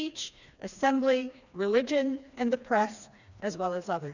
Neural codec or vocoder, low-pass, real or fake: codec, 16 kHz, 2 kbps, FreqCodec, smaller model; 7.2 kHz; fake